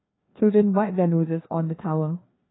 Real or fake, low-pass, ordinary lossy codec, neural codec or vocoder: fake; 7.2 kHz; AAC, 16 kbps; codec, 16 kHz, 2 kbps, FreqCodec, larger model